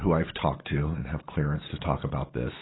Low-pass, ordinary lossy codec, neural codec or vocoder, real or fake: 7.2 kHz; AAC, 16 kbps; none; real